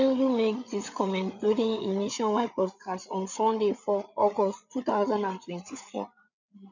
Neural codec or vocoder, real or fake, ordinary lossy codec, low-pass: codec, 16 kHz, 8 kbps, FreqCodec, larger model; fake; none; 7.2 kHz